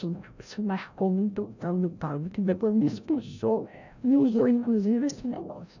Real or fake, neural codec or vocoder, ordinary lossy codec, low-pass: fake; codec, 16 kHz, 0.5 kbps, FreqCodec, larger model; none; 7.2 kHz